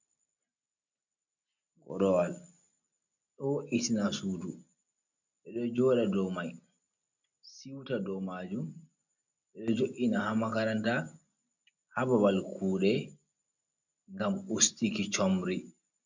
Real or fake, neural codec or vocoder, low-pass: real; none; 7.2 kHz